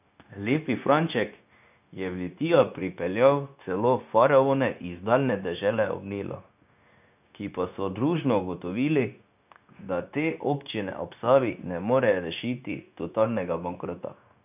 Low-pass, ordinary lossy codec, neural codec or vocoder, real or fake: 3.6 kHz; none; codec, 16 kHz, 0.9 kbps, LongCat-Audio-Codec; fake